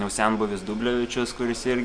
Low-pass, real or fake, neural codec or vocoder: 9.9 kHz; real; none